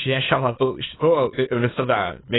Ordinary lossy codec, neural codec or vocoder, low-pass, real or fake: AAC, 16 kbps; autoencoder, 22.05 kHz, a latent of 192 numbers a frame, VITS, trained on many speakers; 7.2 kHz; fake